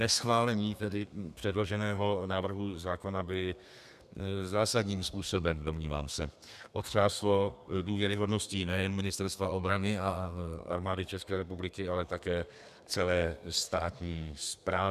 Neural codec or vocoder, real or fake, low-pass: codec, 32 kHz, 1.9 kbps, SNAC; fake; 14.4 kHz